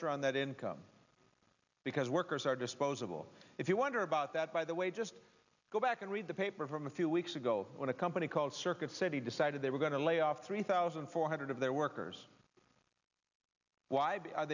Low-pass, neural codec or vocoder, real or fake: 7.2 kHz; none; real